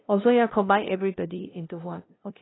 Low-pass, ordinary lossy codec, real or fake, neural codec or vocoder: 7.2 kHz; AAC, 16 kbps; fake; codec, 16 kHz, 0.5 kbps, FunCodec, trained on LibriTTS, 25 frames a second